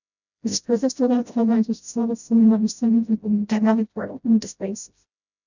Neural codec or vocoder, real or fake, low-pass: codec, 16 kHz, 0.5 kbps, FreqCodec, smaller model; fake; 7.2 kHz